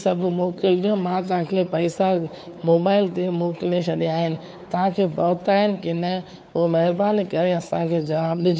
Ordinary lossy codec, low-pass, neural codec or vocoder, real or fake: none; none; codec, 16 kHz, 4 kbps, X-Codec, WavLM features, trained on Multilingual LibriSpeech; fake